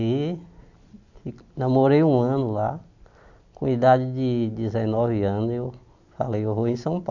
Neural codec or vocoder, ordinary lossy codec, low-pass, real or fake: none; none; 7.2 kHz; real